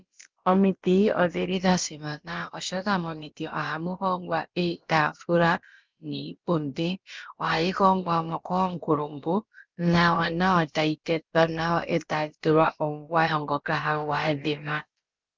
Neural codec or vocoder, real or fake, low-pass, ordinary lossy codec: codec, 16 kHz, about 1 kbps, DyCAST, with the encoder's durations; fake; 7.2 kHz; Opus, 24 kbps